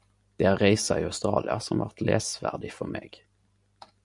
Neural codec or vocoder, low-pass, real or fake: none; 10.8 kHz; real